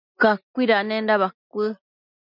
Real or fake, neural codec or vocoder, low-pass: real; none; 5.4 kHz